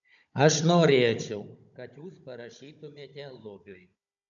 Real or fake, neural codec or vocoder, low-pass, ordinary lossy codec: fake; codec, 16 kHz, 16 kbps, FunCodec, trained on Chinese and English, 50 frames a second; 7.2 kHz; AAC, 64 kbps